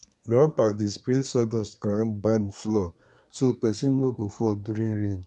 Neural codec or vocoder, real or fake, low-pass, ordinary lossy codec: codec, 24 kHz, 1 kbps, SNAC; fake; 10.8 kHz; none